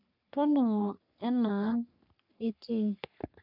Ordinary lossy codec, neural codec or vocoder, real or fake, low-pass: none; codec, 44.1 kHz, 3.4 kbps, Pupu-Codec; fake; 5.4 kHz